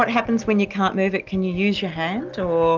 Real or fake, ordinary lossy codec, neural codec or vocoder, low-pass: real; Opus, 24 kbps; none; 7.2 kHz